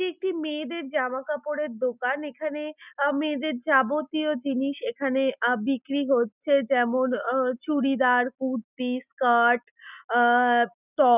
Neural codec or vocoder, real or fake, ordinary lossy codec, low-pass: none; real; none; 3.6 kHz